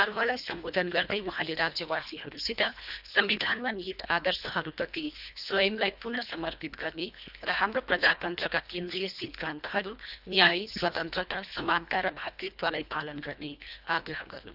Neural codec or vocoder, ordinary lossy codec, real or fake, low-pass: codec, 24 kHz, 1.5 kbps, HILCodec; none; fake; 5.4 kHz